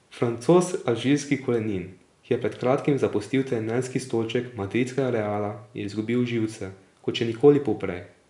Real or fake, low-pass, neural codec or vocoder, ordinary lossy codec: real; 10.8 kHz; none; none